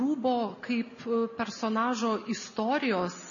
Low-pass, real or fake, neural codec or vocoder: 7.2 kHz; real; none